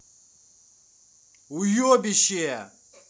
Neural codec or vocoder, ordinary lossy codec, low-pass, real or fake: none; none; none; real